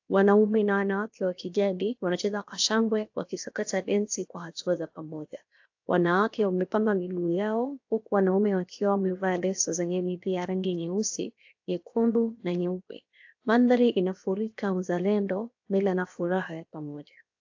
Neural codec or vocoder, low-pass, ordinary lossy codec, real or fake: codec, 16 kHz, 0.7 kbps, FocalCodec; 7.2 kHz; AAC, 48 kbps; fake